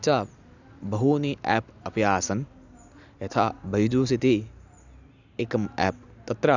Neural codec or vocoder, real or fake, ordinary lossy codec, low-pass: none; real; none; 7.2 kHz